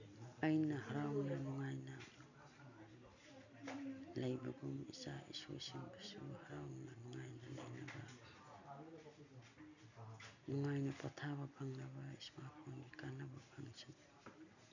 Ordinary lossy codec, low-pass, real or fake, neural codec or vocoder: none; 7.2 kHz; real; none